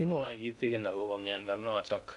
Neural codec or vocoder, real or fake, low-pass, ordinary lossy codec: codec, 16 kHz in and 24 kHz out, 0.6 kbps, FocalCodec, streaming, 2048 codes; fake; 10.8 kHz; none